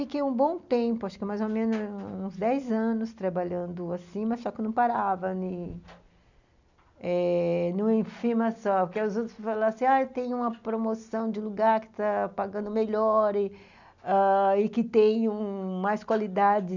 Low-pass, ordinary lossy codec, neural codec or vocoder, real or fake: 7.2 kHz; none; none; real